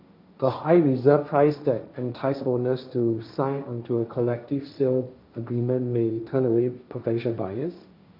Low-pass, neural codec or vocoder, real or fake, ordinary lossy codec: 5.4 kHz; codec, 16 kHz, 1.1 kbps, Voila-Tokenizer; fake; none